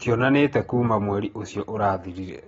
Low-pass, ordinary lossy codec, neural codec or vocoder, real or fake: 19.8 kHz; AAC, 24 kbps; none; real